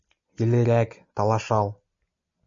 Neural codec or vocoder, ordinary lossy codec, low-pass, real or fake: none; MP3, 64 kbps; 7.2 kHz; real